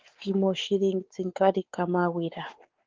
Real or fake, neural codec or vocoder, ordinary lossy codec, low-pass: fake; codec, 16 kHz, 4 kbps, X-Codec, WavLM features, trained on Multilingual LibriSpeech; Opus, 16 kbps; 7.2 kHz